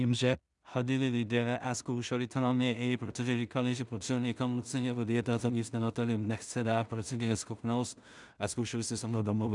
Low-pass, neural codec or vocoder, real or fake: 10.8 kHz; codec, 16 kHz in and 24 kHz out, 0.4 kbps, LongCat-Audio-Codec, two codebook decoder; fake